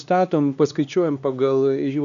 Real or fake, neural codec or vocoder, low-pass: fake; codec, 16 kHz, 2 kbps, X-Codec, HuBERT features, trained on LibriSpeech; 7.2 kHz